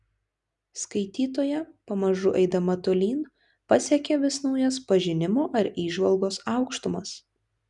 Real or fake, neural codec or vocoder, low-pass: real; none; 10.8 kHz